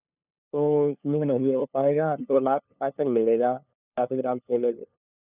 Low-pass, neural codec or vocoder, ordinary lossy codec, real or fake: 3.6 kHz; codec, 16 kHz, 2 kbps, FunCodec, trained on LibriTTS, 25 frames a second; none; fake